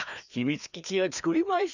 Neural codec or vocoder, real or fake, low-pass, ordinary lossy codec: codec, 16 kHz, 2 kbps, FreqCodec, larger model; fake; 7.2 kHz; none